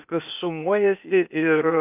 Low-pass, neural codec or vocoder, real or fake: 3.6 kHz; codec, 16 kHz, 0.8 kbps, ZipCodec; fake